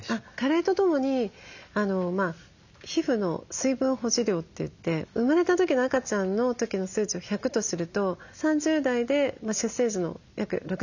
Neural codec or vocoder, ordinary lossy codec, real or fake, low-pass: none; none; real; 7.2 kHz